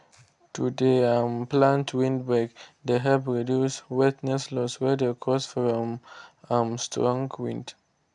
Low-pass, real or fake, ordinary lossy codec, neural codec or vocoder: 10.8 kHz; real; none; none